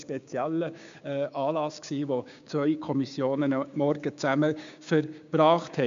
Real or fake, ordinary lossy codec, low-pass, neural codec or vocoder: fake; MP3, 64 kbps; 7.2 kHz; codec, 16 kHz, 6 kbps, DAC